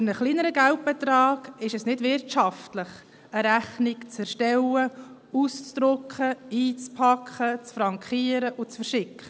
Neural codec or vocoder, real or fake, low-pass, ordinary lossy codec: none; real; none; none